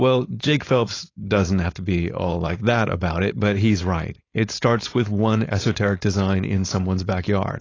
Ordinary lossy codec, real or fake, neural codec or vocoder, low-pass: AAC, 32 kbps; fake; codec, 16 kHz, 4.8 kbps, FACodec; 7.2 kHz